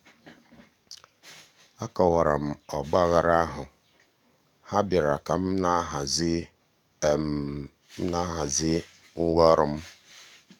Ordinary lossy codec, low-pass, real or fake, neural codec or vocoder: none; 19.8 kHz; fake; codec, 44.1 kHz, 7.8 kbps, DAC